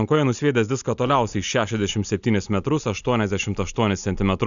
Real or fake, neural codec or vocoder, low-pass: real; none; 7.2 kHz